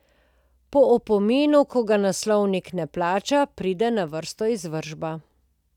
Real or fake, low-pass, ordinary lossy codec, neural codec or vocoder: real; 19.8 kHz; none; none